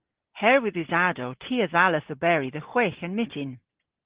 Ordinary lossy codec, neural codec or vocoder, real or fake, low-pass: Opus, 16 kbps; none; real; 3.6 kHz